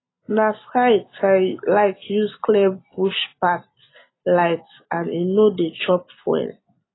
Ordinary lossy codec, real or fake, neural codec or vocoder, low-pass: AAC, 16 kbps; real; none; 7.2 kHz